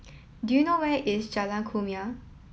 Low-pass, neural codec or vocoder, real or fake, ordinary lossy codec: none; none; real; none